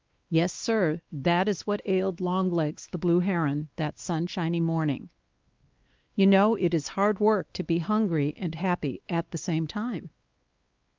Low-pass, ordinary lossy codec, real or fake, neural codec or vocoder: 7.2 kHz; Opus, 32 kbps; fake; codec, 16 kHz, 2 kbps, X-Codec, WavLM features, trained on Multilingual LibriSpeech